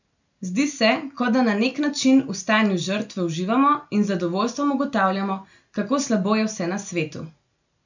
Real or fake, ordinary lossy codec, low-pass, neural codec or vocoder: real; none; 7.2 kHz; none